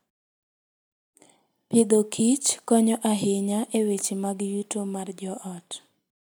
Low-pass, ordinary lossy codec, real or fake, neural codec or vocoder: none; none; real; none